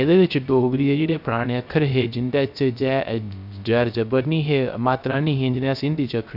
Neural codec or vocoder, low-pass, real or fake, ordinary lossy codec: codec, 16 kHz, 0.3 kbps, FocalCodec; 5.4 kHz; fake; none